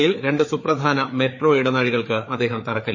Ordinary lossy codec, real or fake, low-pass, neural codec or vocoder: MP3, 32 kbps; fake; 7.2 kHz; codec, 16 kHz, 4 kbps, FreqCodec, larger model